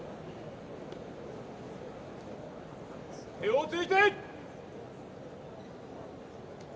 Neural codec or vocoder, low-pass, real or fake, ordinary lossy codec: none; none; real; none